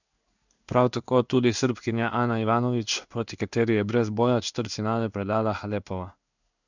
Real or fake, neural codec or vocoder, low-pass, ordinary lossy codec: fake; codec, 16 kHz in and 24 kHz out, 1 kbps, XY-Tokenizer; 7.2 kHz; none